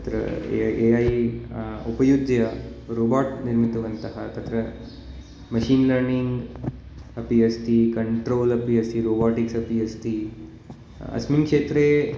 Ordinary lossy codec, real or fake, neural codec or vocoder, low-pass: none; real; none; none